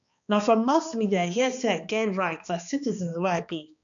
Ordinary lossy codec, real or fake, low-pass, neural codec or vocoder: none; fake; 7.2 kHz; codec, 16 kHz, 2 kbps, X-Codec, HuBERT features, trained on balanced general audio